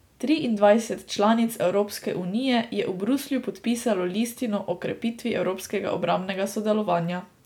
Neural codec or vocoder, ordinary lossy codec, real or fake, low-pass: none; none; real; 19.8 kHz